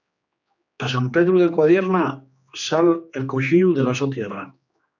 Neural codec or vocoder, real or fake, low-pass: codec, 16 kHz, 2 kbps, X-Codec, HuBERT features, trained on general audio; fake; 7.2 kHz